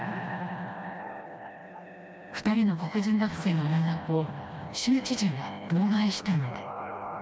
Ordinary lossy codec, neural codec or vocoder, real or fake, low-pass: none; codec, 16 kHz, 1 kbps, FreqCodec, smaller model; fake; none